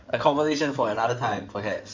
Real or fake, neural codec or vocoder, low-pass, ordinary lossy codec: fake; codec, 16 kHz in and 24 kHz out, 2.2 kbps, FireRedTTS-2 codec; 7.2 kHz; MP3, 64 kbps